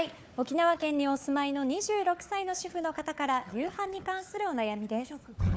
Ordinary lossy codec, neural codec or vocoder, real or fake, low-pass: none; codec, 16 kHz, 4 kbps, FunCodec, trained on Chinese and English, 50 frames a second; fake; none